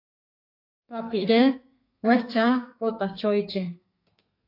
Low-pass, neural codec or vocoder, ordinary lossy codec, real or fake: 5.4 kHz; codec, 32 kHz, 1.9 kbps, SNAC; AAC, 32 kbps; fake